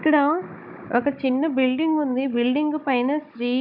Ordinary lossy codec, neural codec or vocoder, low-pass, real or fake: none; codec, 16 kHz, 16 kbps, FunCodec, trained on Chinese and English, 50 frames a second; 5.4 kHz; fake